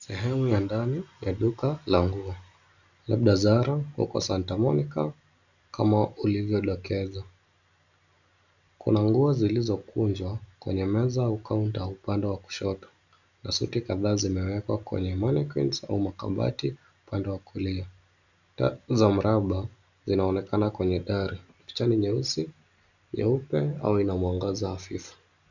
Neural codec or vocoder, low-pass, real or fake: none; 7.2 kHz; real